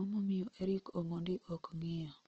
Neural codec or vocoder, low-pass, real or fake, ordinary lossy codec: none; 7.2 kHz; real; Opus, 16 kbps